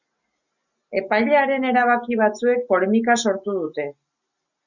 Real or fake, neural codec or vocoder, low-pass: real; none; 7.2 kHz